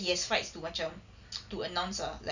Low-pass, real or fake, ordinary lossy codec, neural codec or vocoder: 7.2 kHz; real; none; none